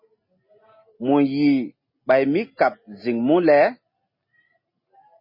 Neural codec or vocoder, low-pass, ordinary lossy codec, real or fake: none; 5.4 kHz; MP3, 24 kbps; real